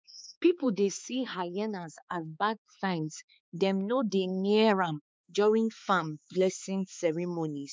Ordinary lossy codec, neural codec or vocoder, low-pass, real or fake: none; codec, 16 kHz, 4 kbps, X-Codec, HuBERT features, trained on balanced general audio; none; fake